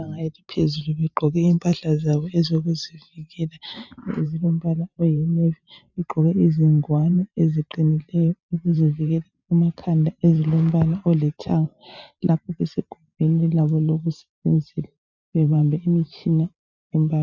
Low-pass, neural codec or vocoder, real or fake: 7.2 kHz; vocoder, 44.1 kHz, 128 mel bands every 512 samples, BigVGAN v2; fake